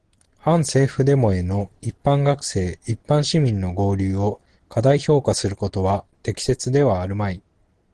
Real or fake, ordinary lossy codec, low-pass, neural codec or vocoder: real; Opus, 16 kbps; 9.9 kHz; none